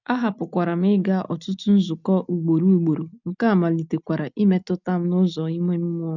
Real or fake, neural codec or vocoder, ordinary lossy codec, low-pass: real; none; none; 7.2 kHz